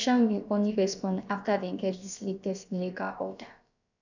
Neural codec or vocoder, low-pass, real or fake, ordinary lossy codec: codec, 16 kHz, about 1 kbps, DyCAST, with the encoder's durations; 7.2 kHz; fake; none